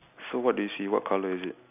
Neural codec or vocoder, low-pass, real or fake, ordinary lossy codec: none; 3.6 kHz; real; none